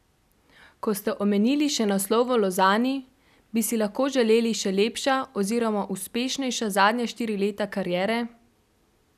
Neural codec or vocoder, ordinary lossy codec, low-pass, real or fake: none; none; 14.4 kHz; real